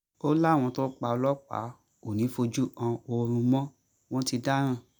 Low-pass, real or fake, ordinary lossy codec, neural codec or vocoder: none; real; none; none